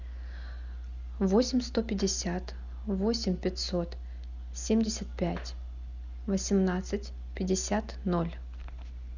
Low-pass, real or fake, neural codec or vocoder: 7.2 kHz; real; none